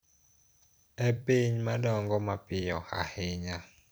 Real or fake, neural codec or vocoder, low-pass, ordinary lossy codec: fake; vocoder, 44.1 kHz, 128 mel bands every 256 samples, BigVGAN v2; none; none